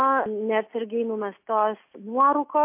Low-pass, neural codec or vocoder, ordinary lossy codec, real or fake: 3.6 kHz; none; MP3, 24 kbps; real